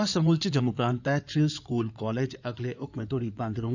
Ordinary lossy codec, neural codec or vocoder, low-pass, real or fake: none; codec, 16 kHz in and 24 kHz out, 2.2 kbps, FireRedTTS-2 codec; 7.2 kHz; fake